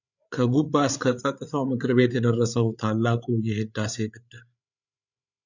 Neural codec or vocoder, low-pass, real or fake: codec, 16 kHz, 8 kbps, FreqCodec, larger model; 7.2 kHz; fake